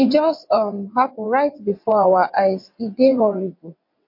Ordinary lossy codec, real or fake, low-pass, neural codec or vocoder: MP3, 32 kbps; fake; 5.4 kHz; vocoder, 22.05 kHz, 80 mel bands, WaveNeXt